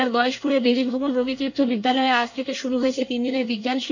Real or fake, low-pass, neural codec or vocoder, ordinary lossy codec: fake; 7.2 kHz; codec, 24 kHz, 1 kbps, SNAC; none